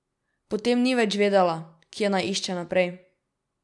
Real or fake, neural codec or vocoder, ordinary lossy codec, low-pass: real; none; none; 10.8 kHz